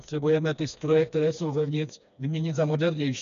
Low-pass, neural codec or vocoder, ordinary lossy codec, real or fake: 7.2 kHz; codec, 16 kHz, 2 kbps, FreqCodec, smaller model; MP3, 96 kbps; fake